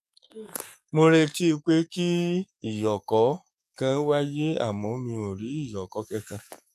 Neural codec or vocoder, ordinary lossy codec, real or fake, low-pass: codec, 44.1 kHz, 7.8 kbps, DAC; none; fake; 14.4 kHz